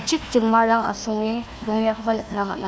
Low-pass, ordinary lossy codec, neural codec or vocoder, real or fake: none; none; codec, 16 kHz, 1 kbps, FunCodec, trained on Chinese and English, 50 frames a second; fake